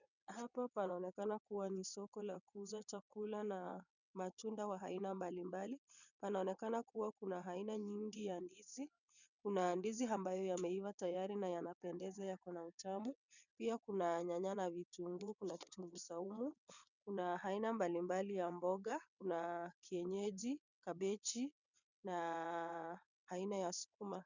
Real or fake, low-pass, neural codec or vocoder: fake; 7.2 kHz; vocoder, 22.05 kHz, 80 mel bands, WaveNeXt